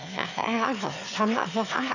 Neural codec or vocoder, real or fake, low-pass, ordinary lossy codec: autoencoder, 22.05 kHz, a latent of 192 numbers a frame, VITS, trained on one speaker; fake; 7.2 kHz; none